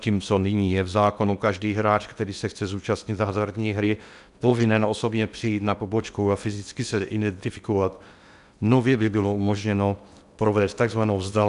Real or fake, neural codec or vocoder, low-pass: fake; codec, 16 kHz in and 24 kHz out, 0.8 kbps, FocalCodec, streaming, 65536 codes; 10.8 kHz